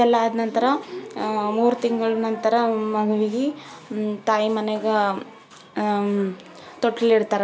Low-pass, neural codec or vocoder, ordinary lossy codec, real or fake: none; none; none; real